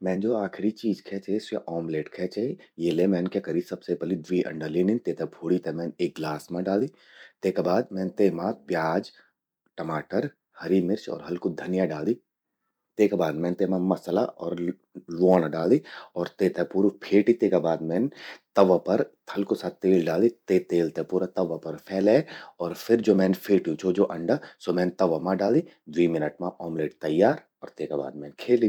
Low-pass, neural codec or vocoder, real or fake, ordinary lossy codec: 19.8 kHz; vocoder, 44.1 kHz, 128 mel bands every 256 samples, BigVGAN v2; fake; none